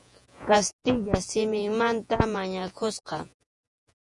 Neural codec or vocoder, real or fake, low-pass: vocoder, 48 kHz, 128 mel bands, Vocos; fake; 10.8 kHz